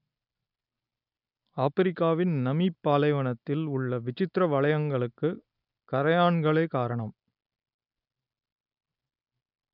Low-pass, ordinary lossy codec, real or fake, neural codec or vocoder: 5.4 kHz; none; real; none